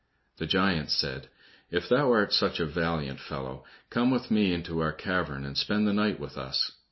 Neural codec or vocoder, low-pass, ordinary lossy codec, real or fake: none; 7.2 kHz; MP3, 24 kbps; real